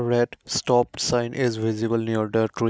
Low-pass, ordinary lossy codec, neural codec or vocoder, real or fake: none; none; none; real